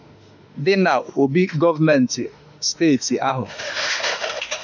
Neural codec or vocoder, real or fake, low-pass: autoencoder, 48 kHz, 32 numbers a frame, DAC-VAE, trained on Japanese speech; fake; 7.2 kHz